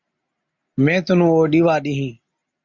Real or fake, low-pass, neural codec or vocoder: real; 7.2 kHz; none